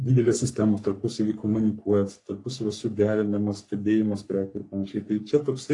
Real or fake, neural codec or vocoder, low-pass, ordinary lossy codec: fake; codec, 44.1 kHz, 3.4 kbps, Pupu-Codec; 10.8 kHz; AAC, 48 kbps